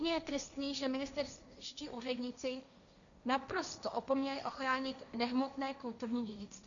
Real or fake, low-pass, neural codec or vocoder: fake; 7.2 kHz; codec, 16 kHz, 1.1 kbps, Voila-Tokenizer